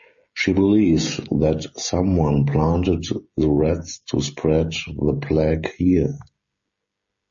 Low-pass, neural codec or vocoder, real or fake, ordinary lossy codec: 7.2 kHz; codec, 16 kHz, 16 kbps, FreqCodec, smaller model; fake; MP3, 32 kbps